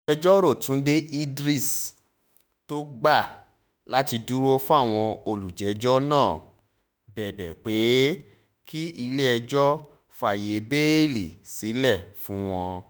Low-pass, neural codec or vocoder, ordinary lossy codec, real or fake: none; autoencoder, 48 kHz, 32 numbers a frame, DAC-VAE, trained on Japanese speech; none; fake